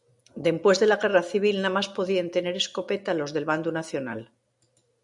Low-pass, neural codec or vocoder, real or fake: 10.8 kHz; none; real